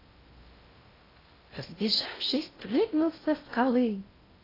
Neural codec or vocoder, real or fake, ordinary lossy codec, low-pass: codec, 16 kHz in and 24 kHz out, 0.6 kbps, FocalCodec, streaming, 4096 codes; fake; AAC, 24 kbps; 5.4 kHz